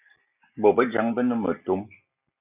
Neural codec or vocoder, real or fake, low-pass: none; real; 3.6 kHz